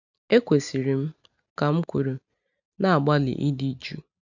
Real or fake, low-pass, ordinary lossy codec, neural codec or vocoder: real; 7.2 kHz; none; none